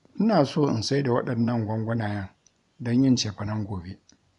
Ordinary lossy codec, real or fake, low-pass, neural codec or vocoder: none; fake; 10.8 kHz; vocoder, 24 kHz, 100 mel bands, Vocos